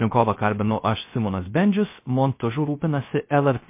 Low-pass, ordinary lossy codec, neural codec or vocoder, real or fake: 3.6 kHz; MP3, 24 kbps; codec, 16 kHz, 0.3 kbps, FocalCodec; fake